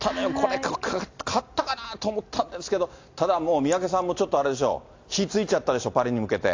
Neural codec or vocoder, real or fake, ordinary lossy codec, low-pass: none; real; AAC, 48 kbps; 7.2 kHz